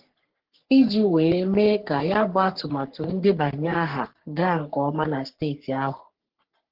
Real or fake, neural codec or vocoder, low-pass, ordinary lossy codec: fake; codec, 44.1 kHz, 3.4 kbps, Pupu-Codec; 5.4 kHz; Opus, 16 kbps